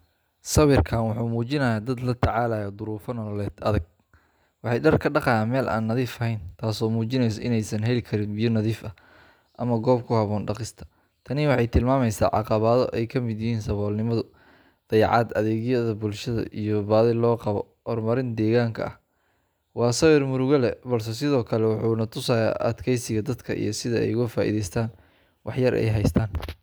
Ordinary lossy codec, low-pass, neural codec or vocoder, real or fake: none; none; none; real